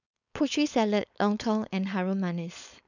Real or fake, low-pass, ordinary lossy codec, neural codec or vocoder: fake; 7.2 kHz; none; codec, 16 kHz, 4.8 kbps, FACodec